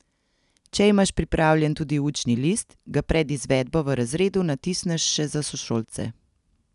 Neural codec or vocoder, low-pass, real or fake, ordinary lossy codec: none; 10.8 kHz; real; none